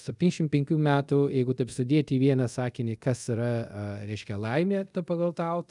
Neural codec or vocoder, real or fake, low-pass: codec, 24 kHz, 0.5 kbps, DualCodec; fake; 10.8 kHz